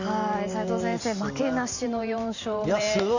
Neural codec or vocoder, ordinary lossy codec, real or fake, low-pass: vocoder, 44.1 kHz, 128 mel bands every 512 samples, BigVGAN v2; none; fake; 7.2 kHz